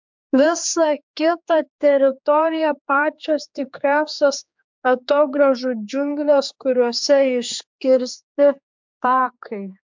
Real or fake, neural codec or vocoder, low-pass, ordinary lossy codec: fake; codec, 16 kHz, 4 kbps, X-Codec, HuBERT features, trained on general audio; 7.2 kHz; MP3, 64 kbps